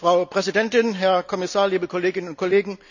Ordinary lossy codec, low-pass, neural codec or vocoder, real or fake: none; 7.2 kHz; none; real